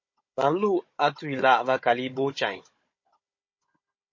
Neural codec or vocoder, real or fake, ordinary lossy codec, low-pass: codec, 16 kHz, 16 kbps, FunCodec, trained on Chinese and English, 50 frames a second; fake; MP3, 32 kbps; 7.2 kHz